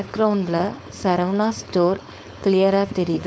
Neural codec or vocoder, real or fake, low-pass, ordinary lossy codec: codec, 16 kHz, 4.8 kbps, FACodec; fake; none; none